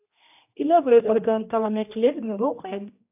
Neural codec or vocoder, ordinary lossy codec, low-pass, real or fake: codec, 16 kHz, 1 kbps, X-Codec, HuBERT features, trained on general audio; AAC, 32 kbps; 3.6 kHz; fake